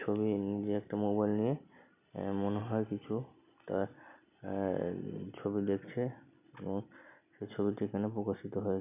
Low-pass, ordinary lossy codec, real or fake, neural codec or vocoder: 3.6 kHz; none; real; none